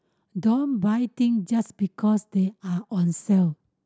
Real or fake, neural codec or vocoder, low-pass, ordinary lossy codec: real; none; none; none